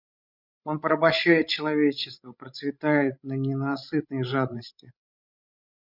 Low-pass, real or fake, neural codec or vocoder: 5.4 kHz; fake; codec, 16 kHz, 16 kbps, FreqCodec, larger model